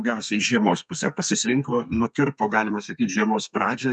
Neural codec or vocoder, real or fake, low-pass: codec, 32 kHz, 1.9 kbps, SNAC; fake; 10.8 kHz